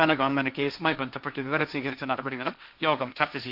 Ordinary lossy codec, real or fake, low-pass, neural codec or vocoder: none; fake; 5.4 kHz; codec, 16 kHz, 1.1 kbps, Voila-Tokenizer